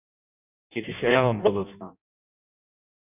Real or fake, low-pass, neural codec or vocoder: fake; 3.6 kHz; codec, 16 kHz in and 24 kHz out, 0.6 kbps, FireRedTTS-2 codec